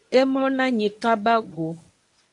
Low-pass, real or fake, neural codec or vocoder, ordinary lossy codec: 10.8 kHz; fake; codec, 24 kHz, 0.9 kbps, WavTokenizer, medium speech release version 1; AAC, 64 kbps